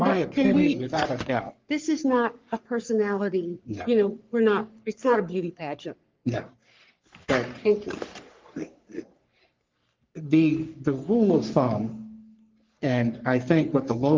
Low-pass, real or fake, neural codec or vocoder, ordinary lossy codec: 7.2 kHz; fake; codec, 44.1 kHz, 3.4 kbps, Pupu-Codec; Opus, 32 kbps